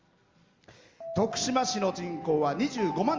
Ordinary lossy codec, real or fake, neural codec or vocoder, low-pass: Opus, 32 kbps; real; none; 7.2 kHz